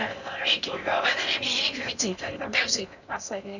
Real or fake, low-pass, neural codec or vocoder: fake; 7.2 kHz; codec, 16 kHz in and 24 kHz out, 0.6 kbps, FocalCodec, streaming, 4096 codes